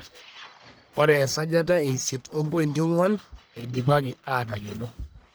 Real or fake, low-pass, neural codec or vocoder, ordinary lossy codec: fake; none; codec, 44.1 kHz, 1.7 kbps, Pupu-Codec; none